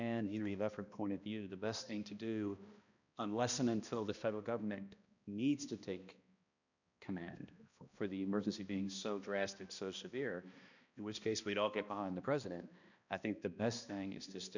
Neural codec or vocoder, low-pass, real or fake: codec, 16 kHz, 1 kbps, X-Codec, HuBERT features, trained on balanced general audio; 7.2 kHz; fake